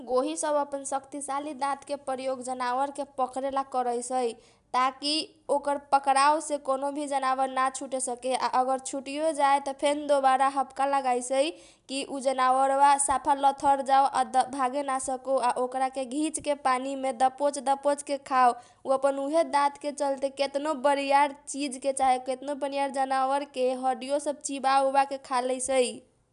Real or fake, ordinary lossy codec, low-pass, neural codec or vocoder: real; none; 10.8 kHz; none